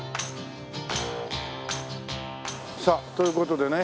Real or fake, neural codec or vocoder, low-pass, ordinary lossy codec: real; none; none; none